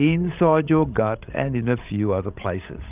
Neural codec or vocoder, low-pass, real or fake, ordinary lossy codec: codec, 16 kHz, 16 kbps, FreqCodec, larger model; 3.6 kHz; fake; Opus, 24 kbps